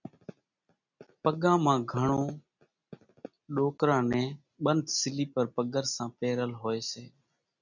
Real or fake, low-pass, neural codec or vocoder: real; 7.2 kHz; none